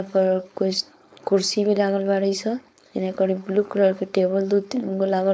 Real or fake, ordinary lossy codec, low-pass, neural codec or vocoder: fake; none; none; codec, 16 kHz, 4.8 kbps, FACodec